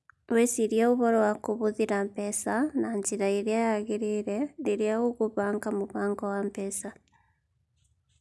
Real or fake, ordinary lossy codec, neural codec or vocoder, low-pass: real; none; none; none